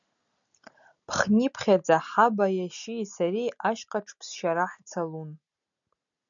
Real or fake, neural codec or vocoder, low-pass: real; none; 7.2 kHz